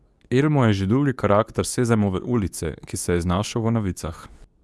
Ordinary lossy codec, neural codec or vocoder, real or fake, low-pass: none; codec, 24 kHz, 0.9 kbps, WavTokenizer, medium speech release version 2; fake; none